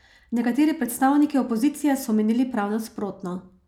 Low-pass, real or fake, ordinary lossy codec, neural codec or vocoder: 19.8 kHz; real; none; none